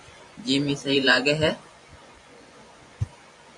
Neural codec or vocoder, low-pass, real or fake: vocoder, 24 kHz, 100 mel bands, Vocos; 10.8 kHz; fake